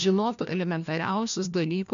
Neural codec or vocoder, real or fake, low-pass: codec, 16 kHz, 1 kbps, FunCodec, trained on LibriTTS, 50 frames a second; fake; 7.2 kHz